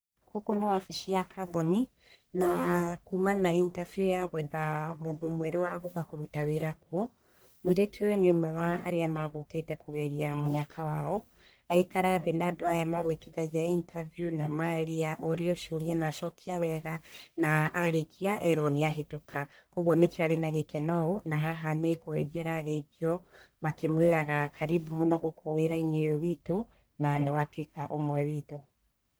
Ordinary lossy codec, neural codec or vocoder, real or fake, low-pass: none; codec, 44.1 kHz, 1.7 kbps, Pupu-Codec; fake; none